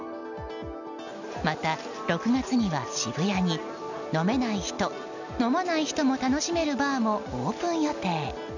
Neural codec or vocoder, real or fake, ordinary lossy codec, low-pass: none; real; none; 7.2 kHz